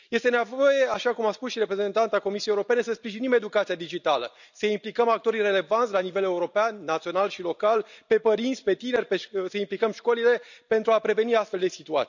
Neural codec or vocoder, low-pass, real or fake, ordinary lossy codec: none; 7.2 kHz; real; none